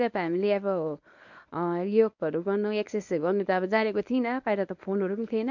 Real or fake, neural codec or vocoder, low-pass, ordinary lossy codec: fake; codec, 24 kHz, 0.9 kbps, WavTokenizer, medium speech release version 1; 7.2 kHz; none